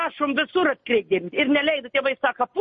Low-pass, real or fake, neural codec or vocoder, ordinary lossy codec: 7.2 kHz; real; none; MP3, 32 kbps